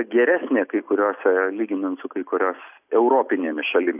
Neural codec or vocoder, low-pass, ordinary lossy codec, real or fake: none; 3.6 kHz; AAC, 32 kbps; real